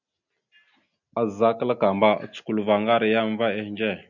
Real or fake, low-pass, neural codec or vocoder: real; 7.2 kHz; none